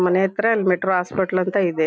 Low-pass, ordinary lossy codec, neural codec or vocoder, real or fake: none; none; none; real